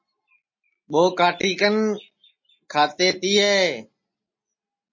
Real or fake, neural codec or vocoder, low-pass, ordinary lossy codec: real; none; 7.2 kHz; MP3, 32 kbps